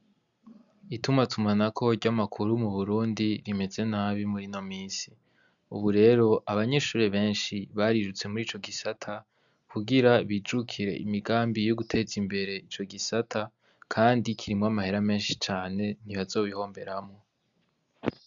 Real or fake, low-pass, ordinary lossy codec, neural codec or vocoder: real; 7.2 kHz; MP3, 96 kbps; none